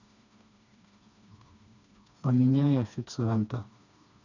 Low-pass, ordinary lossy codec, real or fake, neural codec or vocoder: 7.2 kHz; none; fake; codec, 16 kHz, 2 kbps, FreqCodec, smaller model